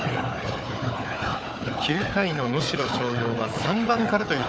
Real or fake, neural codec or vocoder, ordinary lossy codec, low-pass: fake; codec, 16 kHz, 4 kbps, FunCodec, trained on Chinese and English, 50 frames a second; none; none